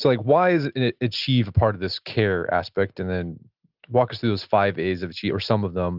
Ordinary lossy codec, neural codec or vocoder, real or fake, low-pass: Opus, 32 kbps; none; real; 5.4 kHz